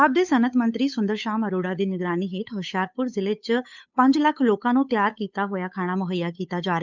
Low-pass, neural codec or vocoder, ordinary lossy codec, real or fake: 7.2 kHz; codec, 16 kHz, 8 kbps, FunCodec, trained on Chinese and English, 25 frames a second; none; fake